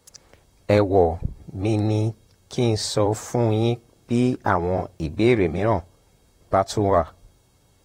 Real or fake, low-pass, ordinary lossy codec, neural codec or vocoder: fake; 19.8 kHz; AAC, 48 kbps; vocoder, 44.1 kHz, 128 mel bands, Pupu-Vocoder